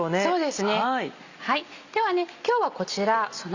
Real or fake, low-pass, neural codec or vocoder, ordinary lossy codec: real; 7.2 kHz; none; Opus, 64 kbps